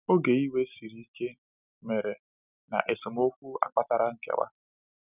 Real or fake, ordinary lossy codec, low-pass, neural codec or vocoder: real; none; 3.6 kHz; none